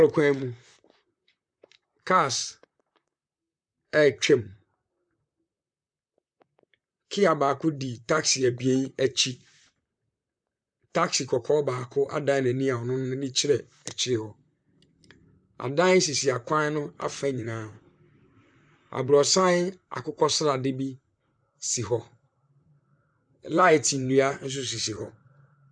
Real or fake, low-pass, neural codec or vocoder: fake; 9.9 kHz; vocoder, 44.1 kHz, 128 mel bands, Pupu-Vocoder